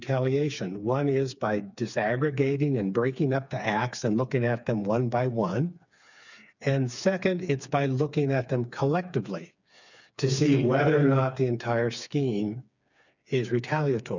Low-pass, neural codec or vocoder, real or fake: 7.2 kHz; codec, 16 kHz, 4 kbps, FreqCodec, smaller model; fake